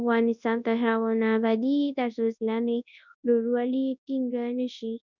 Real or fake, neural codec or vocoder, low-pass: fake; codec, 24 kHz, 0.9 kbps, WavTokenizer, large speech release; 7.2 kHz